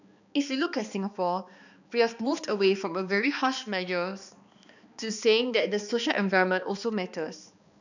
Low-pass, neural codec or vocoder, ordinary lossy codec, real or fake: 7.2 kHz; codec, 16 kHz, 2 kbps, X-Codec, HuBERT features, trained on balanced general audio; none; fake